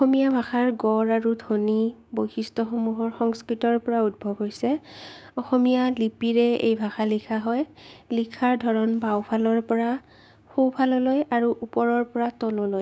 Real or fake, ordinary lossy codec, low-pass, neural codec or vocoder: fake; none; none; codec, 16 kHz, 6 kbps, DAC